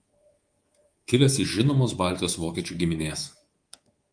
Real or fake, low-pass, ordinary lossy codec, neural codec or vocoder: fake; 9.9 kHz; Opus, 24 kbps; codec, 24 kHz, 3.1 kbps, DualCodec